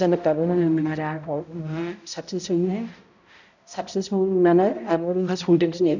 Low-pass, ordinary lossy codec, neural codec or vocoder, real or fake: 7.2 kHz; none; codec, 16 kHz, 0.5 kbps, X-Codec, HuBERT features, trained on balanced general audio; fake